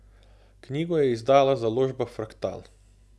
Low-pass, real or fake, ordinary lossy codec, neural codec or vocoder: none; real; none; none